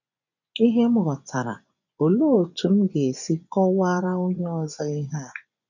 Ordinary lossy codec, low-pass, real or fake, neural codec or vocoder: none; 7.2 kHz; fake; vocoder, 24 kHz, 100 mel bands, Vocos